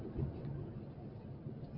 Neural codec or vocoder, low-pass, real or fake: none; 5.4 kHz; real